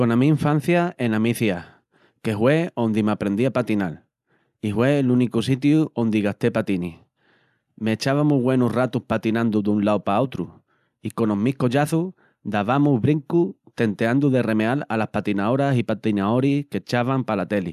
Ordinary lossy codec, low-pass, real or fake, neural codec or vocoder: none; 14.4 kHz; real; none